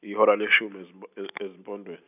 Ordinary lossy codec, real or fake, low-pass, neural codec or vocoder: none; real; 3.6 kHz; none